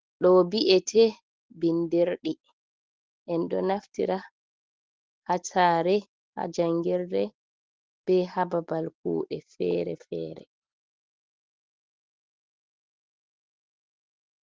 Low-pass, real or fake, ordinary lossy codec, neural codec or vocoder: 7.2 kHz; real; Opus, 16 kbps; none